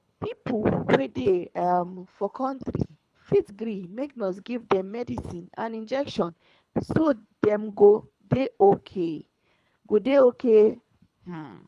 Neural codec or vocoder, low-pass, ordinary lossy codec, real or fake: codec, 24 kHz, 3 kbps, HILCodec; none; none; fake